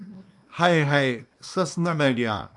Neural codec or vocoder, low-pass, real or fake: codec, 24 kHz, 0.9 kbps, WavTokenizer, small release; 10.8 kHz; fake